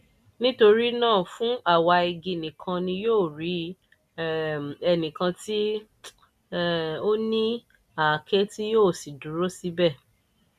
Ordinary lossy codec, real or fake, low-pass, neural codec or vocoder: none; real; 14.4 kHz; none